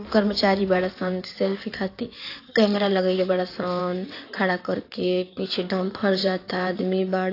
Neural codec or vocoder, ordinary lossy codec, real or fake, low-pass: codec, 16 kHz in and 24 kHz out, 2.2 kbps, FireRedTTS-2 codec; AAC, 32 kbps; fake; 5.4 kHz